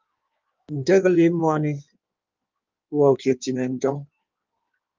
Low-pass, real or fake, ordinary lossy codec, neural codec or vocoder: 7.2 kHz; fake; Opus, 24 kbps; codec, 16 kHz in and 24 kHz out, 1.1 kbps, FireRedTTS-2 codec